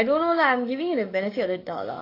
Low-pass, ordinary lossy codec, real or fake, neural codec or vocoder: 5.4 kHz; AAC, 32 kbps; fake; codec, 44.1 kHz, 7.8 kbps, DAC